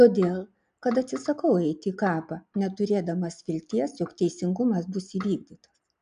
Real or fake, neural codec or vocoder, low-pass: real; none; 9.9 kHz